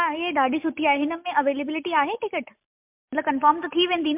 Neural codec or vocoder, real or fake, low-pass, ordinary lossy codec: none; real; 3.6 kHz; MP3, 32 kbps